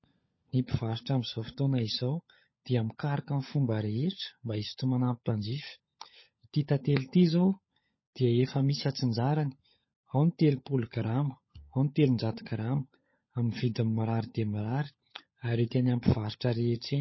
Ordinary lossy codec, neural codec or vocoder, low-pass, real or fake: MP3, 24 kbps; codec, 16 kHz, 16 kbps, FunCodec, trained on LibriTTS, 50 frames a second; 7.2 kHz; fake